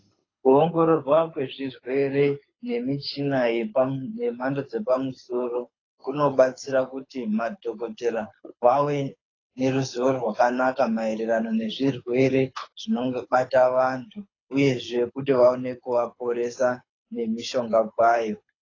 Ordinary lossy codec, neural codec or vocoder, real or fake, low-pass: AAC, 32 kbps; codec, 24 kHz, 6 kbps, HILCodec; fake; 7.2 kHz